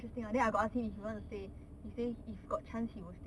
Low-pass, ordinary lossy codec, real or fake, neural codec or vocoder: none; none; real; none